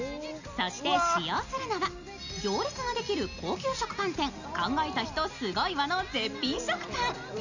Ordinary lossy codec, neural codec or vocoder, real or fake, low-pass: none; none; real; 7.2 kHz